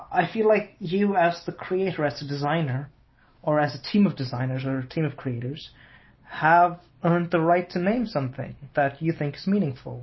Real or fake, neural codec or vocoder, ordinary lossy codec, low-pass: real; none; MP3, 24 kbps; 7.2 kHz